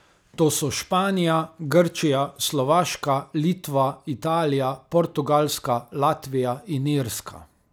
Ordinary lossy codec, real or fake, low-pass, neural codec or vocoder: none; real; none; none